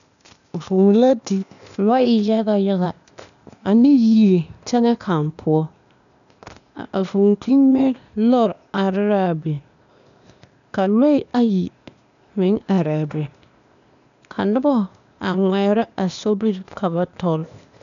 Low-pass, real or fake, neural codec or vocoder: 7.2 kHz; fake; codec, 16 kHz, 0.8 kbps, ZipCodec